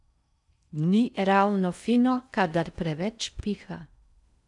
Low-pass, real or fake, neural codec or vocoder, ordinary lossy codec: 10.8 kHz; fake; codec, 16 kHz in and 24 kHz out, 0.6 kbps, FocalCodec, streaming, 4096 codes; none